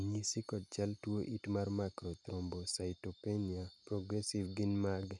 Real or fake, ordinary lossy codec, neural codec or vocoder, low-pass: real; none; none; 10.8 kHz